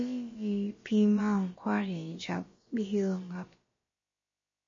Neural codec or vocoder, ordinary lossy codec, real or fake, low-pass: codec, 16 kHz, about 1 kbps, DyCAST, with the encoder's durations; MP3, 32 kbps; fake; 7.2 kHz